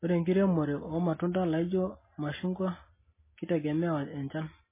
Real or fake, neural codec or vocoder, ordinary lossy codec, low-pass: real; none; MP3, 16 kbps; 3.6 kHz